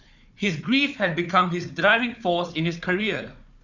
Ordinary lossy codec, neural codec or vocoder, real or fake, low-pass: none; codec, 16 kHz, 4 kbps, FunCodec, trained on Chinese and English, 50 frames a second; fake; 7.2 kHz